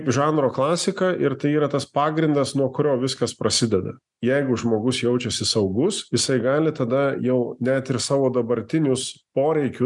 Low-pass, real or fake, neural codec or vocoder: 10.8 kHz; real; none